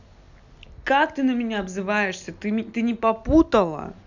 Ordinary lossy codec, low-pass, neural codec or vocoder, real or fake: none; 7.2 kHz; none; real